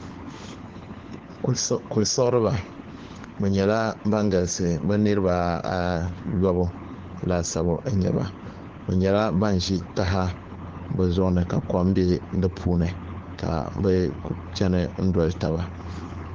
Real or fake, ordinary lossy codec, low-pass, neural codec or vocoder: fake; Opus, 16 kbps; 7.2 kHz; codec, 16 kHz, 4 kbps, FunCodec, trained on LibriTTS, 50 frames a second